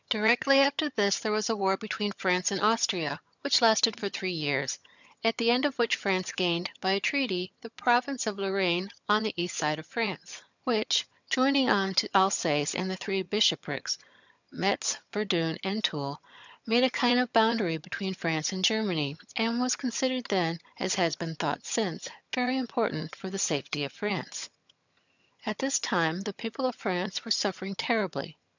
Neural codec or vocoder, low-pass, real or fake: vocoder, 22.05 kHz, 80 mel bands, HiFi-GAN; 7.2 kHz; fake